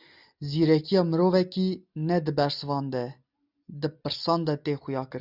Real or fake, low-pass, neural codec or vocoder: real; 5.4 kHz; none